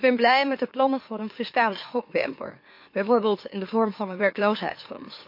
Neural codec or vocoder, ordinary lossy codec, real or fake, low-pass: autoencoder, 44.1 kHz, a latent of 192 numbers a frame, MeloTTS; MP3, 32 kbps; fake; 5.4 kHz